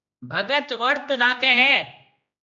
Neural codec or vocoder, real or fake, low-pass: codec, 16 kHz, 1 kbps, X-Codec, HuBERT features, trained on general audio; fake; 7.2 kHz